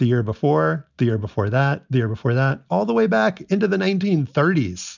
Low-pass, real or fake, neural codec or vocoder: 7.2 kHz; real; none